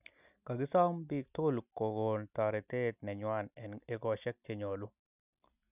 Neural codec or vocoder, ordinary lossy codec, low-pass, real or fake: none; none; 3.6 kHz; real